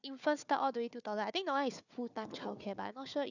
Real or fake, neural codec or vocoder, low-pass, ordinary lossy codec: fake; codec, 16 kHz, 4 kbps, FunCodec, trained on Chinese and English, 50 frames a second; 7.2 kHz; none